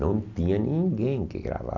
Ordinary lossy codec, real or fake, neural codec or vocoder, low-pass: none; real; none; 7.2 kHz